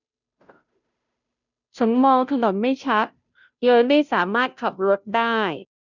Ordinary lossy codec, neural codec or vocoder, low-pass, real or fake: none; codec, 16 kHz, 0.5 kbps, FunCodec, trained on Chinese and English, 25 frames a second; 7.2 kHz; fake